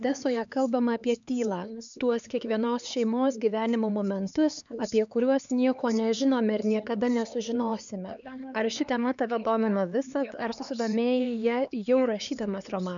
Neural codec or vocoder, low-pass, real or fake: codec, 16 kHz, 4 kbps, X-Codec, HuBERT features, trained on LibriSpeech; 7.2 kHz; fake